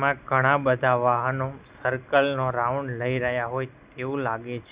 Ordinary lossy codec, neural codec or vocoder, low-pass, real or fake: Opus, 64 kbps; none; 3.6 kHz; real